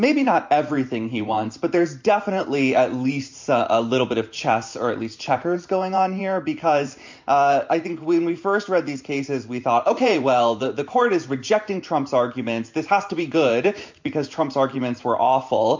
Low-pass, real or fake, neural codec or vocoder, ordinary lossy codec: 7.2 kHz; fake; vocoder, 44.1 kHz, 128 mel bands every 512 samples, BigVGAN v2; MP3, 48 kbps